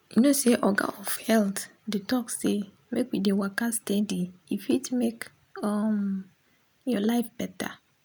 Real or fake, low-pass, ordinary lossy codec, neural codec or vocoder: real; none; none; none